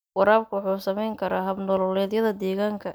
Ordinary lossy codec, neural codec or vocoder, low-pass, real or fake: none; none; none; real